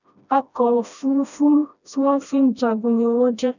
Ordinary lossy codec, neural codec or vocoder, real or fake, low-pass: none; codec, 16 kHz, 1 kbps, FreqCodec, smaller model; fake; 7.2 kHz